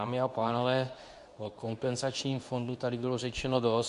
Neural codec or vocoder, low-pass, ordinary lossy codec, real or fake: codec, 24 kHz, 0.9 kbps, WavTokenizer, medium speech release version 2; 10.8 kHz; MP3, 64 kbps; fake